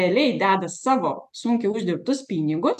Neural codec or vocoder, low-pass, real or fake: none; 14.4 kHz; real